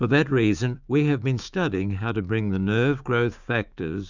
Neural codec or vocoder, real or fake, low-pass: codec, 16 kHz, 6 kbps, DAC; fake; 7.2 kHz